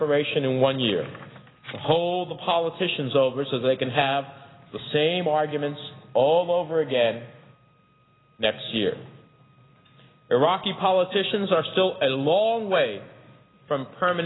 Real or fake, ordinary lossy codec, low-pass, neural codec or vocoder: real; AAC, 16 kbps; 7.2 kHz; none